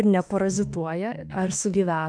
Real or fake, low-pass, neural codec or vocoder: fake; 9.9 kHz; autoencoder, 48 kHz, 32 numbers a frame, DAC-VAE, trained on Japanese speech